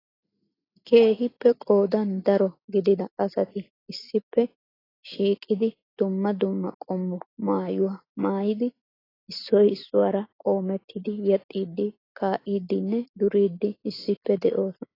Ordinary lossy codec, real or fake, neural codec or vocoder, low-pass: AAC, 24 kbps; real; none; 5.4 kHz